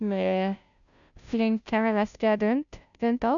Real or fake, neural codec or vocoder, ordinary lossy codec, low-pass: fake; codec, 16 kHz, 0.5 kbps, FunCodec, trained on Chinese and English, 25 frames a second; none; 7.2 kHz